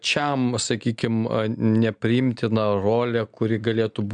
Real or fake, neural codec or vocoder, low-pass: real; none; 9.9 kHz